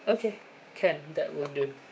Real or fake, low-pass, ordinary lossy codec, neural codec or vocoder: fake; none; none; codec, 16 kHz, 6 kbps, DAC